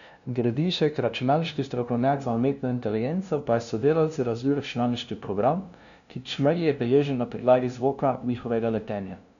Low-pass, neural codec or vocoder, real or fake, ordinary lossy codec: 7.2 kHz; codec, 16 kHz, 0.5 kbps, FunCodec, trained on LibriTTS, 25 frames a second; fake; none